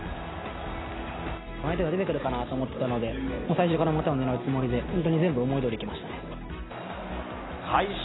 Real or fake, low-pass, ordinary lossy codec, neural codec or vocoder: real; 7.2 kHz; AAC, 16 kbps; none